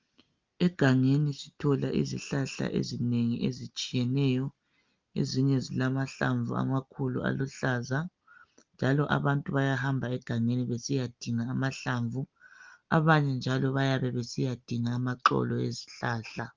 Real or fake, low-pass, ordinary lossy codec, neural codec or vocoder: real; 7.2 kHz; Opus, 32 kbps; none